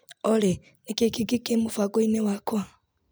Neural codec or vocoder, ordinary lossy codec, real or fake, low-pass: none; none; real; none